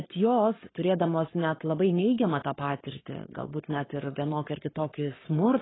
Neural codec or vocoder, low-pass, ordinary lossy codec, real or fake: codec, 44.1 kHz, 7.8 kbps, Pupu-Codec; 7.2 kHz; AAC, 16 kbps; fake